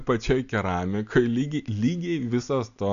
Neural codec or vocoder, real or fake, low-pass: none; real; 7.2 kHz